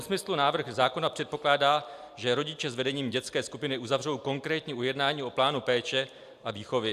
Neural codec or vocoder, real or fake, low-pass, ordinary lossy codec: none; real; 14.4 kHz; AAC, 96 kbps